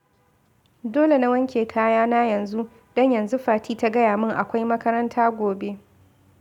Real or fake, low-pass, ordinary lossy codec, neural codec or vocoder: real; 19.8 kHz; none; none